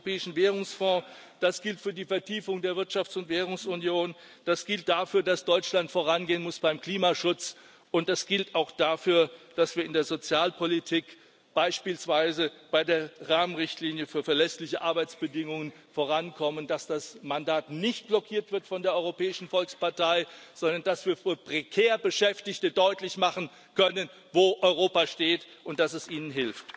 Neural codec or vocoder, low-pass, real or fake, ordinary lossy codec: none; none; real; none